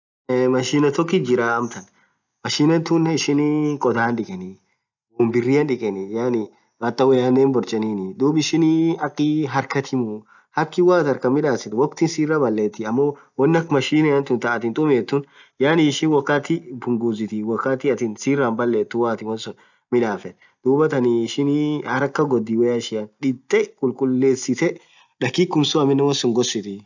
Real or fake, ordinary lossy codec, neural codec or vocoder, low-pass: real; none; none; 7.2 kHz